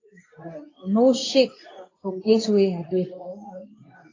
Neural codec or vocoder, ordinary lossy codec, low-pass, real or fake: codec, 24 kHz, 0.9 kbps, WavTokenizer, medium speech release version 2; AAC, 32 kbps; 7.2 kHz; fake